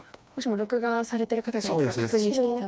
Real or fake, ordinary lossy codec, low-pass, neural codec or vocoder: fake; none; none; codec, 16 kHz, 2 kbps, FreqCodec, smaller model